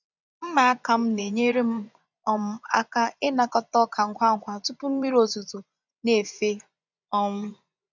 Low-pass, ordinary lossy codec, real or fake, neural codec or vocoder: 7.2 kHz; none; fake; vocoder, 24 kHz, 100 mel bands, Vocos